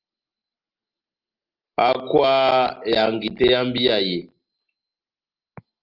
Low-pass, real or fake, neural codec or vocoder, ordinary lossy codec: 5.4 kHz; real; none; Opus, 24 kbps